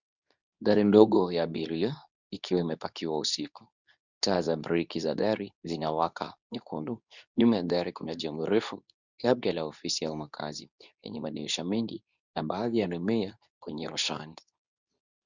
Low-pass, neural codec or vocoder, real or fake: 7.2 kHz; codec, 24 kHz, 0.9 kbps, WavTokenizer, medium speech release version 2; fake